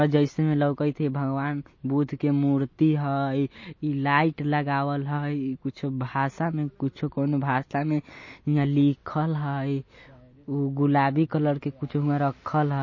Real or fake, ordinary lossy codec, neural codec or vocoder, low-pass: real; MP3, 32 kbps; none; 7.2 kHz